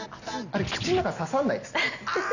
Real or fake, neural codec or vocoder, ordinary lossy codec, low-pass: real; none; none; 7.2 kHz